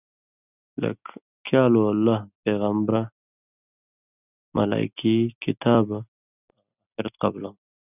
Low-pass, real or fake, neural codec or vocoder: 3.6 kHz; real; none